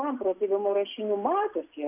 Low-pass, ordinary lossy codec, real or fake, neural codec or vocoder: 3.6 kHz; MP3, 32 kbps; real; none